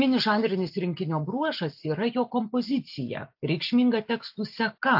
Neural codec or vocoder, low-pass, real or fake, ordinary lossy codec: none; 5.4 kHz; real; AAC, 48 kbps